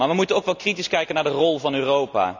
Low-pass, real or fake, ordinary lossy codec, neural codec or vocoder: 7.2 kHz; real; none; none